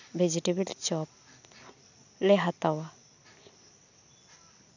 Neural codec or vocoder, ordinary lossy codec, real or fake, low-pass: none; none; real; 7.2 kHz